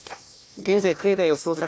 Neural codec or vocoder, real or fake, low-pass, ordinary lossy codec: codec, 16 kHz, 1 kbps, FunCodec, trained on Chinese and English, 50 frames a second; fake; none; none